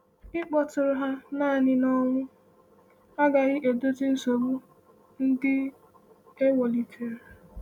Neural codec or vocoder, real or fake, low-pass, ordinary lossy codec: none; real; 19.8 kHz; none